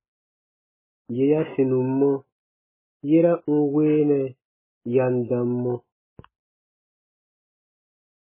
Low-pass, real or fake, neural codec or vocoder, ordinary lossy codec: 3.6 kHz; real; none; MP3, 16 kbps